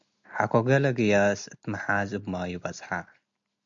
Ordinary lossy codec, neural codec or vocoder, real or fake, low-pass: MP3, 64 kbps; none; real; 7.2 kHz